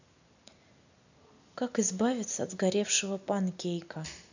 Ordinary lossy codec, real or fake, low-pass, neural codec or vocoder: none; real; 7.2 kHz; none